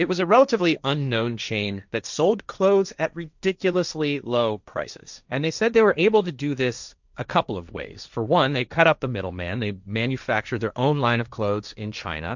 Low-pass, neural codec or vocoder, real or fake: 7.2 kHz; codec, 16 kHz, 1.1 kbps, Voila-Tokenizer; fake